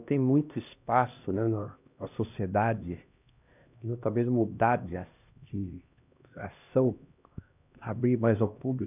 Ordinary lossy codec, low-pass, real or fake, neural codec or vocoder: none; 3.6 kHz; fake; codec, 16 kHz, 1 kbps, X-Codec, HuBERT features, trained on LibriSpeech